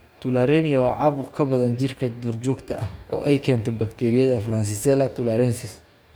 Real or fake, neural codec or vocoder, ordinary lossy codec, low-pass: fake; codec, 44.1 kHz, 2.6 kbps, DAC; none; none